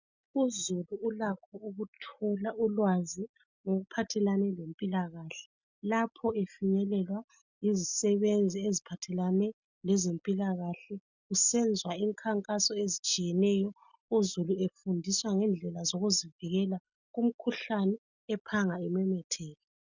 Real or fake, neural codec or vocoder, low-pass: real; none; 7.2 kHz